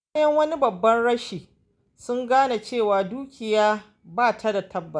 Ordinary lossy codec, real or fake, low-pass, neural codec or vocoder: none; real; 9.9 kHz; none